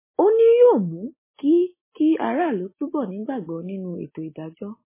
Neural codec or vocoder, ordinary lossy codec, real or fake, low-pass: none; MP3, 16 kbps; real; 3.6 kHz